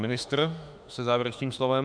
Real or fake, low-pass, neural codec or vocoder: fake; 9.9 kHz; autoencoder, 48 kHz, 32 numbers a frame, DAC-VAE, trained on Japanese speech